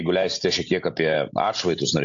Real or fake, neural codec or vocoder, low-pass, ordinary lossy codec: real; none; 7.2 kHz; AAC, 64 kbps